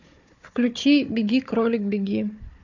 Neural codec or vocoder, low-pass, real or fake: codec, 16 kHz, 4 kbps, FunCodec, trained on Chinese and English, 50 frames a second; 7.2 kHz; fake